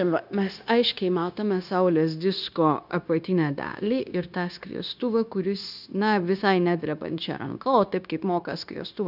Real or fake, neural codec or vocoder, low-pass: fake; codec, 16 kHz, 0.9 kbps, LongCat-Audio-Codec; 5.4 kHz